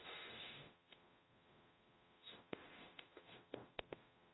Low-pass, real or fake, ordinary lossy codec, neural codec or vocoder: 7.2 kHz; fake; AAC, 16 kbps; codec, 44.1 kHz, 0.9 kbps, DAC